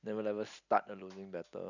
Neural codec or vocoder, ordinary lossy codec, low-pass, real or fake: none; none; 7.2 kHz; real